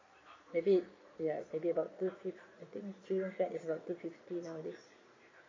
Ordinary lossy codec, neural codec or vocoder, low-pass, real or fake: MP3, 32 kbps; vocoder, 22.05 kHz, 80 mel bands, Vocos; 7.2 kHz; fake